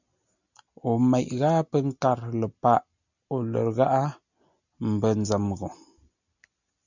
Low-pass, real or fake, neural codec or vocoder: 7.2 kHz; real; none